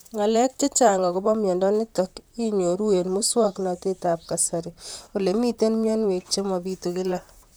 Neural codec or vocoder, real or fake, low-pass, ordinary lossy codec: vocoder, 44.1 kHz, 128 mel bands, Pupu-Vocoder; fake; none; none